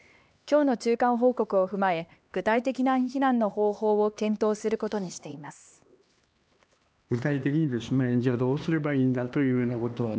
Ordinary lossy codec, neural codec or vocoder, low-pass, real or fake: none; codec, 16 kHz, 2 kbps, X-Codec, HuBERT features, trained on LibriSpeech; none; fake